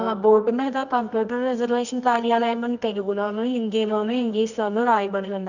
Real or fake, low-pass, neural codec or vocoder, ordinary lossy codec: fake; 7.2 kHz; codec, 24 kHz, 0.9 kbps, WavTokenizer, medium music audio release; none